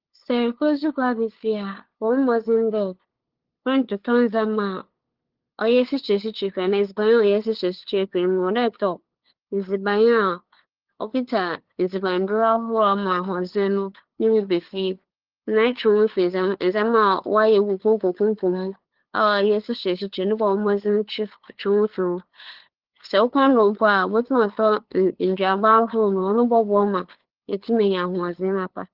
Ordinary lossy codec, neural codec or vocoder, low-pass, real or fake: Opus, 16 kbps; codec, 16 kHz, 8 kbps, FunCodec, trained on LibriTTS, 25 frames a second; 5.4 kHz; fake